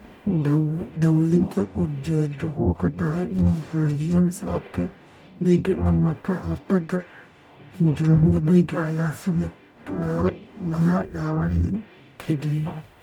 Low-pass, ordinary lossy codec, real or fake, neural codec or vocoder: 19.8 kHz; none; fake; codec, 44.1 kHz, 0.9 kbps, DAC